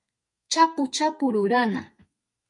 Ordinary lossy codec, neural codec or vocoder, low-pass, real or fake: MP3, 48 kbps; codec, 32 kHz, 1.9 kbps, SNAC; 10.8 kHz; fake